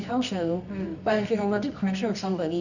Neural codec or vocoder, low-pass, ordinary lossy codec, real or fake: codec, 24 kHz, 0.9 kbps, WavTokenizer, medium music audio release; 7.2 kHz; none; fake